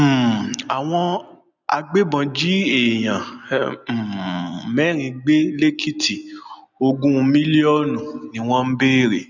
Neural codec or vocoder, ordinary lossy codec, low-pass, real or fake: none; none; 7.2 kHz; real